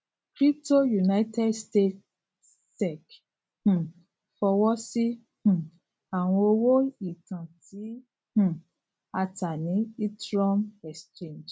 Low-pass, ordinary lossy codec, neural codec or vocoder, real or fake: none; none; none; real